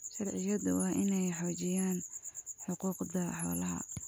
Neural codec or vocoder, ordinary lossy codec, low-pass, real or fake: none; none; none; real